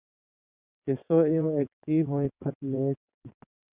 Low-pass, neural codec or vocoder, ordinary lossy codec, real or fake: 3.6 kHz; vocoder, 22.05 kHz, 80 mel bands, WaveNeXt; Opus, 64 kbps; fake